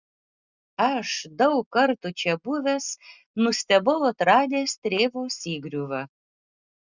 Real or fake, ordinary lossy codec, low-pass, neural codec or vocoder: real; Opus, 64 kbps; 7.2 kHz; none